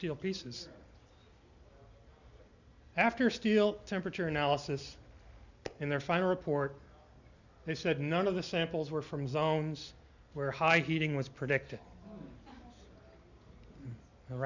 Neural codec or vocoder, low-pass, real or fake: none; 7.2 kHz; real